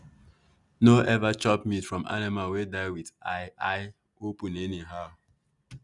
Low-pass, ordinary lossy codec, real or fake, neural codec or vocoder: 10.8 kHz; none; real; none